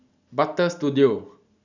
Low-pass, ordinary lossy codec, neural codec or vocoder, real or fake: 7.2 kHz; none; none; real